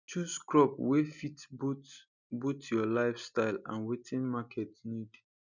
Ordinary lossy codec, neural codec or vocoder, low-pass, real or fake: none; none; 7.2 kHz; real